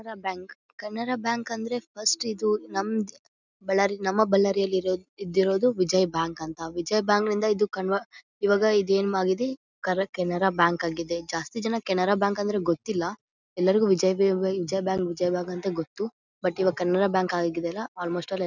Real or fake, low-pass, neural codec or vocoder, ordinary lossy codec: real; 7.2 kHz; none; none